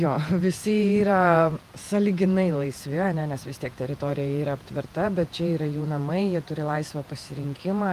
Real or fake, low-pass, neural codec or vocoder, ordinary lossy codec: fake; 14.4 kHz; vocoder, 48 kHz, 128 mel bands, Vocos; Opus, 32 kbps